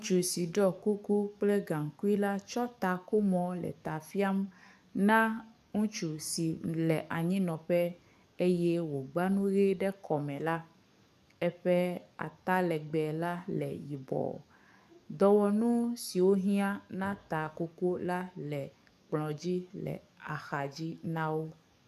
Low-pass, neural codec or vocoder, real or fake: 14.4 kHz; codec, 44.1 kHz, 7.8 kbps, DAC; fake